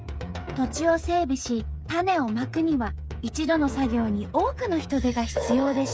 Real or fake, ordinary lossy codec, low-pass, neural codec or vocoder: fake; none; none; codec, 16 kHz, 8 kbps, FreqCodec, smaller model